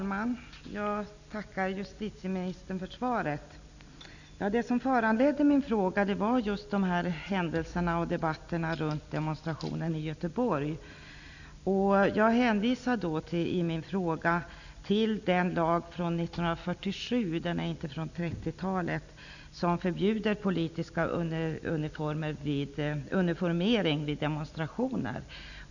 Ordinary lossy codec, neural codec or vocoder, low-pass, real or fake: none; none; 7.2 kHz; real